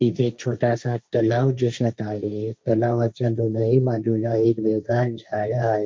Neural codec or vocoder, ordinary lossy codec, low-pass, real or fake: codec, 16 kHz, 1.1 kbps, Voila-Tokenizer; none; none; fake